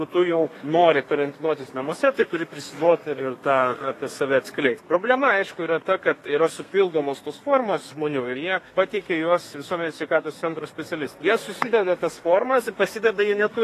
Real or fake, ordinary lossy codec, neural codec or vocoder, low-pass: fake; AAC, 48 kbps; codec, 32 kHz, 1.9 kbps, SNAC; 14.4 kHz